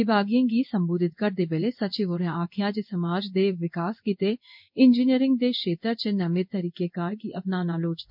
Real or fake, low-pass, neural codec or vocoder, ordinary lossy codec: fake; 5.4 kHz; codec, 16 kHz in and 24 kHz out, 1 kbps, XY-Tokenizer; MP3, 48 kbps